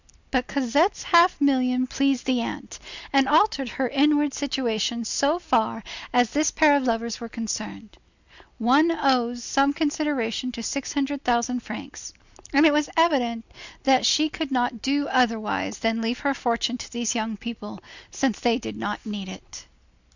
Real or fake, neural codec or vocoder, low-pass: real; none; 7.2 kHz